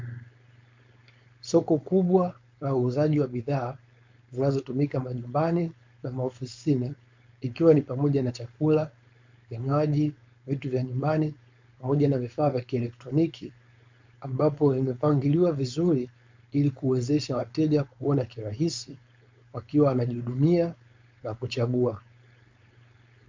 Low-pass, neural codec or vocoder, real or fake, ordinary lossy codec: 7.2 kHz; codec, 16 kHz, 4.8 kbps, FACodec; fake; MP3, 48 kbps